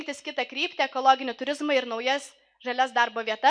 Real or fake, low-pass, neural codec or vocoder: real; 9.9 kHz; none